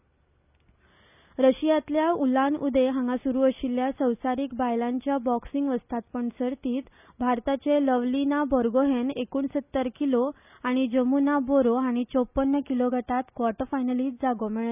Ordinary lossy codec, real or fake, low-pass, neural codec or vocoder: none; real; 3.6 kHz; none